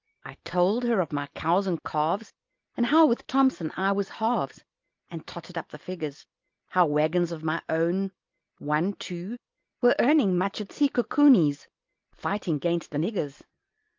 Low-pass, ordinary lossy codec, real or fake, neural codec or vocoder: 7.2 kHz; Opus, 24 kbps; real; none